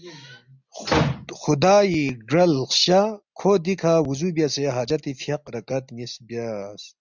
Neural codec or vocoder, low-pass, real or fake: none; 7.2 kHz; real